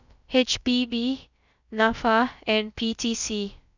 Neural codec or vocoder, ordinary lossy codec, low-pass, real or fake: codec, 16 kHz, about 1 kbps, DyCAST, with the encoder's durations; none; 7.2 kHz; fake